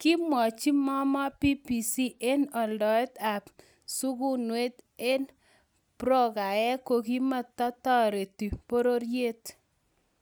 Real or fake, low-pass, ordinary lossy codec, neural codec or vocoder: real; none; none; none